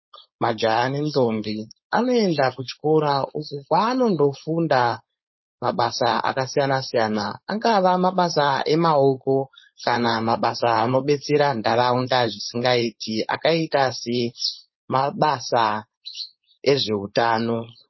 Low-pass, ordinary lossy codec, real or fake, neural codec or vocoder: 7.2 kHz; MP3, 24 kbps; fake; codec, 16 kHz, 4.8 kbps, FACodec